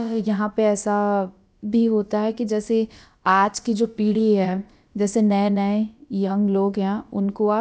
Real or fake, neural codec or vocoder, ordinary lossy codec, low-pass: fake; codec, 16 kHz, about 1 kbps, DyCAST, with the encoder's durations; none; none